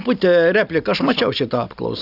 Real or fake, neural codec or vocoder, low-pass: real; none; 5.4 kHz